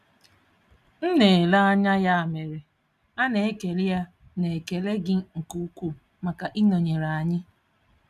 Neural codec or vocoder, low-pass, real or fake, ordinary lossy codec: none; 14.4 kHz; real; none